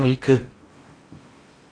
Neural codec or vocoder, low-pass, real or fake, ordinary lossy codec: codec, 16 kHz in and 24 kHz out, 0.8 kbps, FocalCodec, streaming, 65536 codes; 9.9 kHz; fake; AAC, 32 kbps